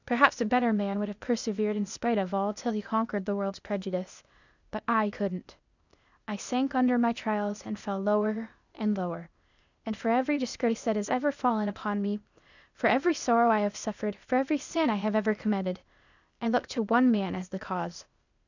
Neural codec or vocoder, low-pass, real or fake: codec, 16 kHz, 0.8 kbps, ZipCodec; 7.2 kHz; fake